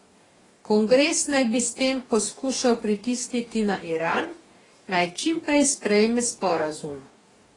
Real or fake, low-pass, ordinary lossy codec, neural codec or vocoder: fake; 10.8 kHz; AAC, 32 kbps; codec, 44.1 kHz, 2.6 kbps, DAC